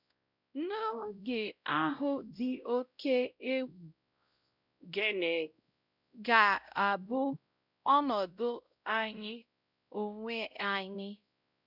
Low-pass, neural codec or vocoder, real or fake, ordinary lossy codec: 5.4 kHz; codec, 16 kHz, 0.5 kbps, X-Codec, WavLM features, trained on Multilingual LibriSpeech; fake; none